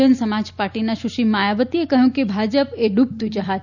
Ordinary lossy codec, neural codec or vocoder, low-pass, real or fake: none; none; 7.2 kHz; real